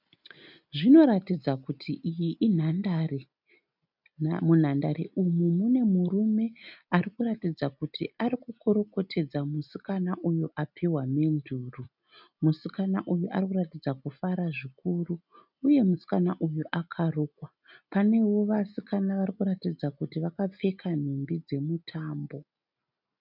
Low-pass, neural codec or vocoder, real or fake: 5.4 kHz; none; real